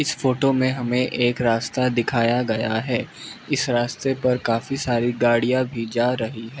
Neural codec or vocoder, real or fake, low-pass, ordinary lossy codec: none; real; none; none